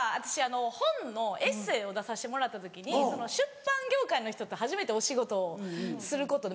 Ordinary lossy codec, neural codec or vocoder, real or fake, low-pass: none; none; real; none